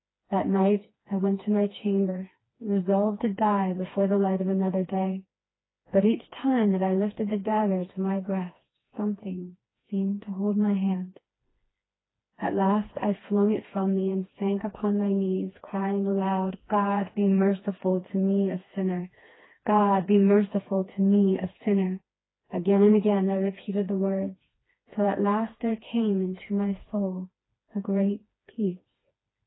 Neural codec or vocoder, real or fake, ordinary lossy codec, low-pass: codec, 16 kHz, 2 kbps, FreqCodec, smaller model; fake; AAC, 16 kbps; 7.2 kHz